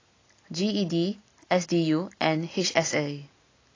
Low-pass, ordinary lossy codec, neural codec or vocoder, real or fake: 7.2 kHz; AAC, 32 kbps; none; real